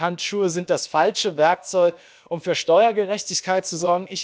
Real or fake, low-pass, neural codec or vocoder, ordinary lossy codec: fake; none; codec, 16 kHz, about 1 kbps, DyCAST, with the encoder's durations; none